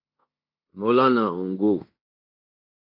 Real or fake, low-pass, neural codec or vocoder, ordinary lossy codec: fake; 5.4 kHz; codec, 16 kHz in and 24 kHz out, 0.9 kbps, LongCat-Audio-Codec, fine tuned four codebook decoder; AAC, 32 kbps